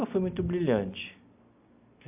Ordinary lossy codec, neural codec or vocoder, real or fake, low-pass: AAC, 32 kbps; none; real; 3.6 kHz